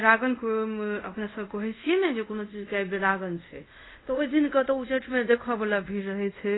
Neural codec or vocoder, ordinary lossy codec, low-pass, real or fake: codec, 24 kHz, 0.5 kbps, DualCodec; AAC, 16 kbps; 7.2 kHz; fake